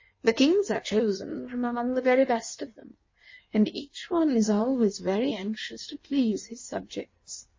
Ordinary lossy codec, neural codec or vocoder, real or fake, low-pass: MP3, 32 kbps; codec, 16 kHz in and 24 kHz out, 1.1 kbps, FireRedTTS-2 codec; fake; 7.2 kHz